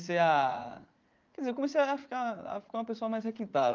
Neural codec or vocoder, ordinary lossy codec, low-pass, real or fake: vocoder, 44.1 kHz, 80 mel bands, Vocos; Opus, 32 kbps; 7.2 kHz; fake